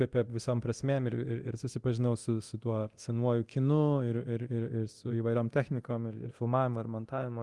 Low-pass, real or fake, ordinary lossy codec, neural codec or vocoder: 10.8 kHz; fake; Opus, 24 kbps; codec, 24 kHz, 0.9 kbps, DualCodec